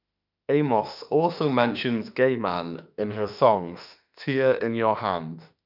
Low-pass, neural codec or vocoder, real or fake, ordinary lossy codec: 5.4 kHz; autoencoder, 48 kHz, 32 numbers a frame, DAC-VAE, trained on Japanese speech; fake; none